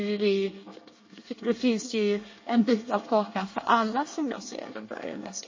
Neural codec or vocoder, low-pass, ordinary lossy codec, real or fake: codec, 24 kHz, 1 kbps, SNAC; 7.2 kHz; MP3, 32 kbps; fake